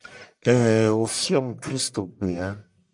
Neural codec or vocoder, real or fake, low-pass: codec, 44.1 kHz, 1.7 kbps, Pupu-Codec; fake; 10.8 kHz